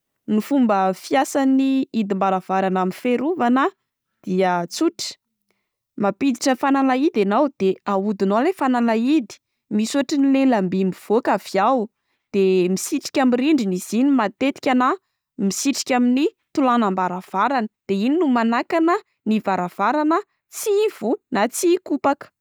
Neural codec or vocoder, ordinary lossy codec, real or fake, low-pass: none; none; real; none